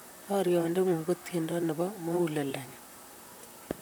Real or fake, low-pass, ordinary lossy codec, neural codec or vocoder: fake; none; none; vocoder, 44.1 kHz, 128 mel bands, Pupu-Vocoder